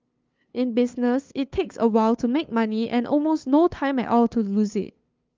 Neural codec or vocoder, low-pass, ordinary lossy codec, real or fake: codec, 16 kHz, 2 kbps, FunCodec, trained on LibriTTS, 25 frames a second; 7.2 kHz; Opus, 32 kbps; fake